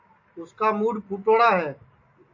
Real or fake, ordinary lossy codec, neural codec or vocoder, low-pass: real; AAC, 48 kbps; none; 7.2 kHz